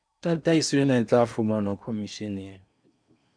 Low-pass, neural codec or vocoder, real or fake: 9.9 kHz; codec, 16 kHz in and 24 kHz out, 0.8 kbps, FocalCodec, streaming, 65536 codes; fake